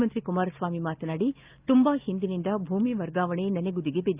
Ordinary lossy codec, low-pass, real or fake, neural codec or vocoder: Opus, 24 kbps; 3.6 kHz; real; none